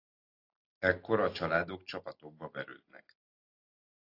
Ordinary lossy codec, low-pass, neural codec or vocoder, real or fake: AAC, 32 kbps; 5.4 kHz; none; real